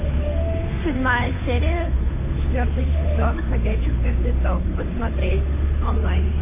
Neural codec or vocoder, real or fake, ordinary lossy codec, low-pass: codec, 16 kHz, 8 kbps, FunCodec, trained on Chinese and English, 25 frames a second; fake; MP3, 16 kbps; 3.6 kHz